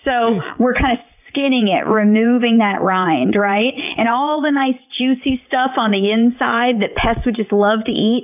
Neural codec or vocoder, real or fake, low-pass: vocoder, 22.05 kHz, 80 mel bands, WaveNeXt; fake; 3.6 kHz